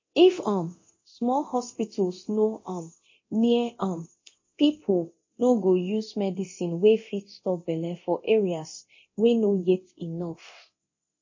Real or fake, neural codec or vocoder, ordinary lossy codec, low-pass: fake; codec, 24 kHz, 0.9 kbps, DualCodec; MP3, 32 kbps; 7.2 kHz